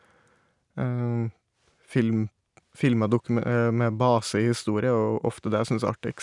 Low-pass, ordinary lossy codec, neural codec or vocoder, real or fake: 10.8 kHz; none; none; real